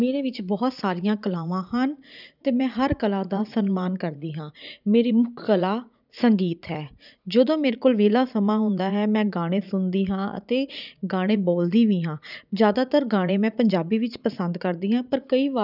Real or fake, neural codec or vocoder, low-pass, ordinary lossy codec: fake; vocoder, 44.1 kHz, 80 mel bands, Vocos; 5.4 kHz; none